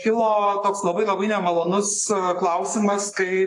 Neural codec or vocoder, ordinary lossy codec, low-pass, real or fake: codec, 44.1 kHz, 7.8 kbps, Pupu-Codec; AAC, 64 kbps; 10.8 kHz; fake